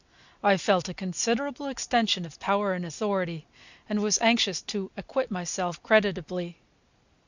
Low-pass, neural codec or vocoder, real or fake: 7.2 kHz; none; real